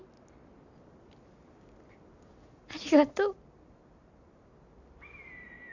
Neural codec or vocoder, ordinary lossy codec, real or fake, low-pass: none; none; real; 7.2 kHz